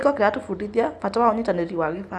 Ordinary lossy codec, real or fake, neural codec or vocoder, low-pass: none; real; none; none